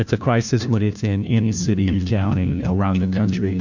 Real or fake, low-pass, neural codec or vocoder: fake; 7.2 kHz; codec, 16 kHz, 1 kbps, FunCodec, trained on LibriTTS, 50 frames a second